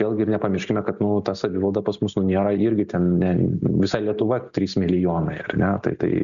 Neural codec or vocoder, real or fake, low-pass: none; real; 7.2 kHz